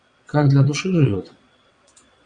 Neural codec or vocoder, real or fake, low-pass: vocoder, 22.05 kHz, 80 mel bands, WaveNeXt; fake; 9.9 kHz